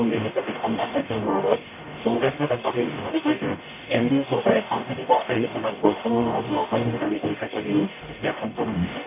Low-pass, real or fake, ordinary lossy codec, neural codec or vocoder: 3.6 kHz; fake; MP3, 24 kbps; codec, 44.1 kHz, 0.9 kbps, DAC